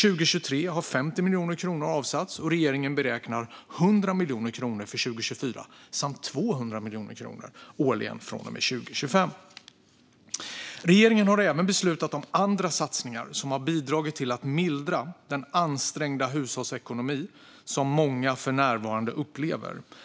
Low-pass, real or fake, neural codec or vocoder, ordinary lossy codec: none; real; none; none